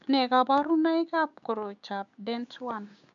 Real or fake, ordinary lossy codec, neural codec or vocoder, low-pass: fake; MP3, 64 kbps; codec, 16 kHz, 6 kbps, DAC; 7.2 kHz